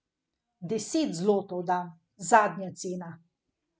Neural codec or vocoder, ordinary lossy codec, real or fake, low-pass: none; none; real; none